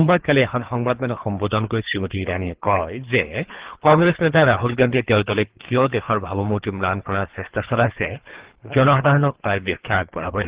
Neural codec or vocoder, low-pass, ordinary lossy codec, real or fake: codec, 24 kHz, 3 kbps, HILCodec; 3.6 kHz; Opus, 16 kbps; fake